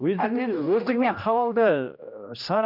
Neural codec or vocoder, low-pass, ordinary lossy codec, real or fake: codec, 16 kHz, 1 kbps, X-Codec, HuBERT features, trained on general audio; 5.4 kHz; none; fake